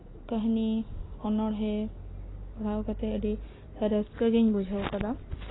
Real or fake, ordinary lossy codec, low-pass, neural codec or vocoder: real; AAC, 16 kbps; 7.2 kHz; none